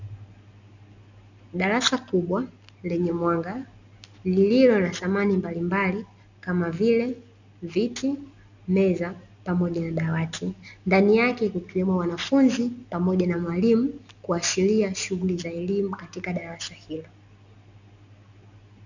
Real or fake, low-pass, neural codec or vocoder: real; 7.2 kHz; none